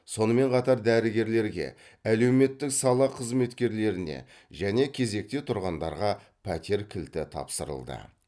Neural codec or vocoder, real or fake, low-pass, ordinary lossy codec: none; real; none; none